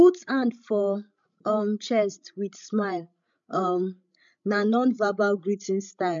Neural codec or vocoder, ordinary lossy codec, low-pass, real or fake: codec, 16 kHz, 16 kbps, FreqCodec, larger model; MP3, 64 kbps; 7.2 kHz; fake